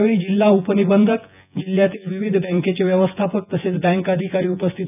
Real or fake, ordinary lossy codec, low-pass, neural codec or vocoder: fake; none; 3.6 kHz; vocoder, 24 kHz, 100 mel bands, Vocos